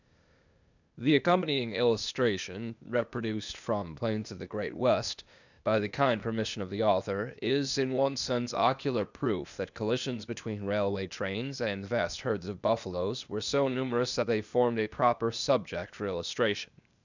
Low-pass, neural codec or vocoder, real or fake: 7.2 kHz; codec, 16 kHz, 0.8 kbps, ZipCodec; fake